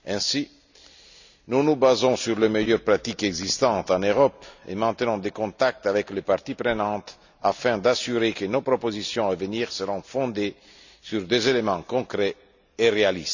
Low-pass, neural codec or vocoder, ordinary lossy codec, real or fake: 7.2 kHz; none; none; real